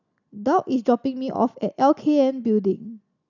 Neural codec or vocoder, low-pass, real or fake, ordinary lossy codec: none; 7.2 kHz; real; none